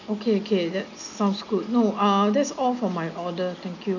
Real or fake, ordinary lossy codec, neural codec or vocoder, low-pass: real; none; none; 7.2 kHz